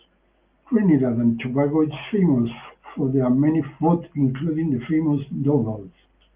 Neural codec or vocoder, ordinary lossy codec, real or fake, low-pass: none; Opus, 32 kbps; real; 3.6 kHz